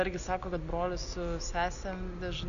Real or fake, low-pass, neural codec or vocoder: real; 7.2 kHz; none